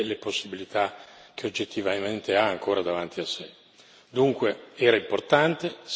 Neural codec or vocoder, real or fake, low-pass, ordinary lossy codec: none; real; none; none